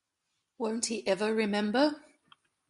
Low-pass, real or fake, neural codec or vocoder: 10.8 kHz; real; none